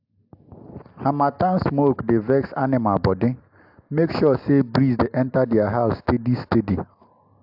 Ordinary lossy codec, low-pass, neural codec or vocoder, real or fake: AAC, 48 kbps; 5.4 kHz; none; real